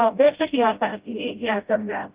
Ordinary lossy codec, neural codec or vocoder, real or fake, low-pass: Opus, 32 kbps; codec, 16 kHz, 0.5 kbps, FreqCodec, smaller model; fake; 3.6 kHz